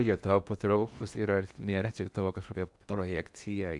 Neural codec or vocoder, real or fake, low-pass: codec, 16 kHz in and 24 kHz out, 0.8 kbps, FocalCodec, streaming, 65536 codes; fake; 10.8 kHz